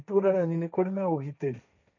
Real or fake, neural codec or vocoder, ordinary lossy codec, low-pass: fake; codec, 16 kHz, 1.1 kbps, Voila-Tokenizer; none; 7.2 kHz